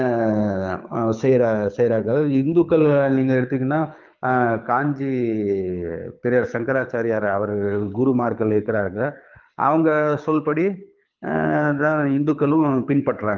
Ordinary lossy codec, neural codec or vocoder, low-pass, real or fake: Opus, 32 kbps; codec, 24 kHz, 6 kbps, HILCodec; 7.2 kHz; fake